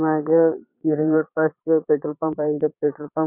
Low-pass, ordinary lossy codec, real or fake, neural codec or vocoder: 3.6 kHz; none; fake; vocoder, 22.05 kHz, 80 mel bands, Vocos